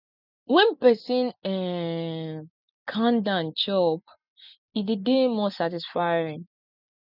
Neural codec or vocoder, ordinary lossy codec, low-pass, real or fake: none; none; 5.4 kHz; real